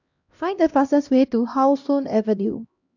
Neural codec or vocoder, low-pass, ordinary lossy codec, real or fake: codec, 16 kHz, 1 kbps, X-Codec, HuBERT features, trained on LibriSpeech; 7.2 kHz; none; fake